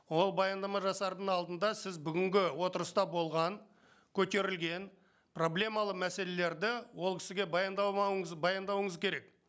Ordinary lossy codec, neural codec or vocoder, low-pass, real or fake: none; none; none; real